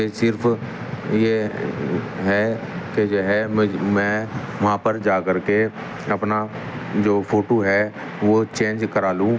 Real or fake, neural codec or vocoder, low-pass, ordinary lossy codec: real; none; none; none